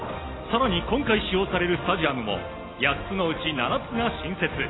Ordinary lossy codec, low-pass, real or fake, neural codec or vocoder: AAC, 16 kbps; 7.2 kHz; real; none